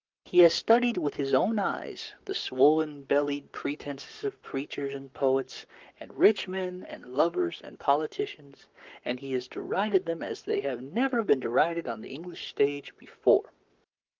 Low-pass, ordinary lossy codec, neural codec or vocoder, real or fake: 7.2 kHz; Opus, 24 kbps; codec, 44.1 kHz, 7.8 kbps, Pupu-Codec; fake